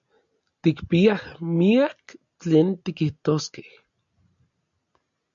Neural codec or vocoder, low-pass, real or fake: none; 7.2 kHz; real